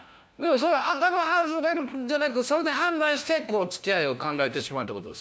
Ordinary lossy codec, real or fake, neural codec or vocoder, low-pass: none; fake; codec, 16 kHz, 1 kbps, FunCodec, trained on LibriTTS, 50 frames a second; none